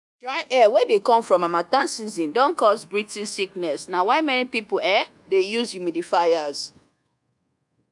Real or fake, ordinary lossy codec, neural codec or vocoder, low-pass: fake; none; codec, 24 kHz, 1.2 kbps, DualCodec; 10.8 kHz